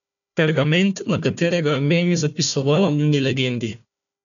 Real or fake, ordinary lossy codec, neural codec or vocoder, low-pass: fake; none; codec, 16 kHz, 1 kbps, FunCodec, trained on Chinese and English, 50 frames a second; 7.2 kHz